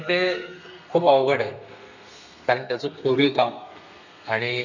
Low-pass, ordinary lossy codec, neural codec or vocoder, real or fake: 7.2 kHz; none; codec, 32 kHz, 1.9 kbps, SNAC; fake